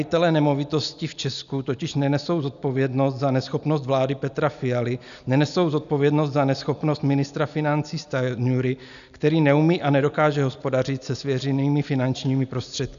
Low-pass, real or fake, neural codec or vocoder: 7.2 kHz; real; none